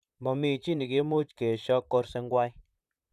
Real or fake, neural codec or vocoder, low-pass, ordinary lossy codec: fake; vocoder, 44.1 kHz, 128 mel bands, Pupu-Vocoder; 14.4 kHz; none